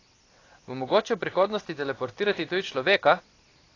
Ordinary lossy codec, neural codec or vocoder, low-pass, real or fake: AAC, 32 kbps; none; 7.2 kHz; real